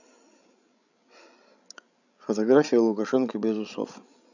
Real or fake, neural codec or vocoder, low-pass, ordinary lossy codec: fake; codec, 16 kHz, 16 kbps, FreqCodec, larger model; 7.2 kHz; none